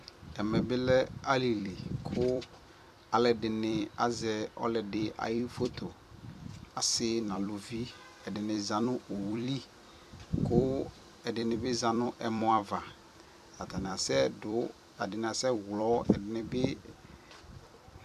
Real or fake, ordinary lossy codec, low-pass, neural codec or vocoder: fake; AAC, 96 kbps; 14.4 kHz; vocoder, 44.1 kHz, 128 mel bands every 256 samples, BigVGAN v2